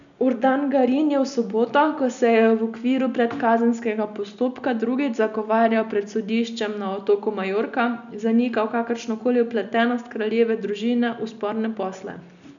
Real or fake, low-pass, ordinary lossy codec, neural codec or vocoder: real; 7.2 kHz; none; none